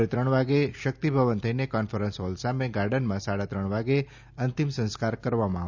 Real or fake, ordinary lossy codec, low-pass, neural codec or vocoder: real; none; 7.2 kHz; none